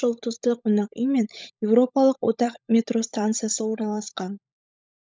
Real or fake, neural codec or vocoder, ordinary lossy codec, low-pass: fake; codec, 16 kHz, 16 kbps, FunCodec, trained on LibriTTS, 50 frames a second; none; none